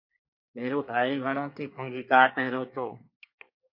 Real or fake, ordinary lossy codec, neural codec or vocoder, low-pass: fake; MP3, 32 kbps; codec, 24 kHz, 1 kbps, SNAC; 5.4 kHz